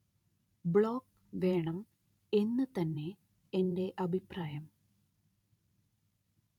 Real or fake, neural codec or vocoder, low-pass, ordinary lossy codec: fake; vocoder, 44.1 kHz, 128 mel bands every 512 samples, BigVGAN v2; 19.8 kHz; none